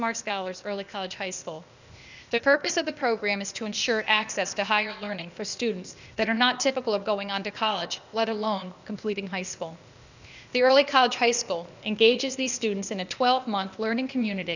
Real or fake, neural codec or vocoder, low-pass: fake; codec, 16 kHz, 0.8 kbps, ZipCodec; 7.2 kHz